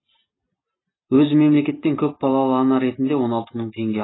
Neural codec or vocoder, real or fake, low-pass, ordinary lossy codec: none; real; 7.2 kHz; AAC, 16 kbps